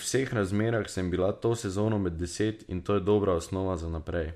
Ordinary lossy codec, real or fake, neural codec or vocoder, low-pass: MP3, 64 kbps; real; none; 14.4 kHz